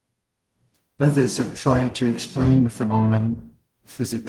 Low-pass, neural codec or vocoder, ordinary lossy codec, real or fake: 19.8 kHz; codec, 44.1 kHz, 0.9 kbps, DAC; Opus, 24 kbps; fake